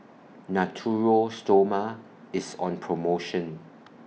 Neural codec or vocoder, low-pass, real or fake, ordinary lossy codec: none; none; real; none